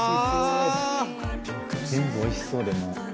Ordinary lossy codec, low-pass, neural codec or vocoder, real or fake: none; none; none; real